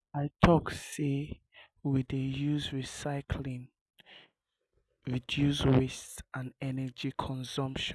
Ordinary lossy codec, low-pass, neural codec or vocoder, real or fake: none; none; none; real